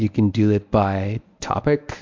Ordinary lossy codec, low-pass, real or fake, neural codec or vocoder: MP3, 64 kbps; 7.2 kHz; fake; codec, 24 kHz, 0.9 kbps, WavTokenizer, medium speech release version 1